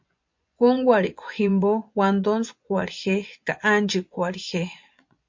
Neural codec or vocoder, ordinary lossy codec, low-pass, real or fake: vocoder, 24 kHz, 100 mel bands, Vocos; MP3, 48 kbps; 7.2 kHz; fake